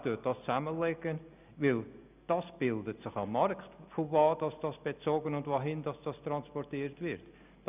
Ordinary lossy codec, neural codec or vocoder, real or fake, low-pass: none; none; real; 3.6 kHz